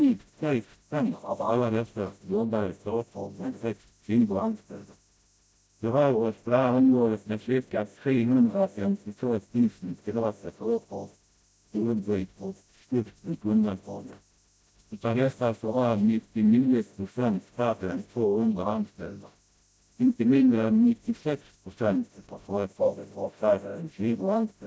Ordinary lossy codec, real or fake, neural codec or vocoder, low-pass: none; fake; codec, 16 kHz, 0.5 kbps, FreqCodec, smaller model; none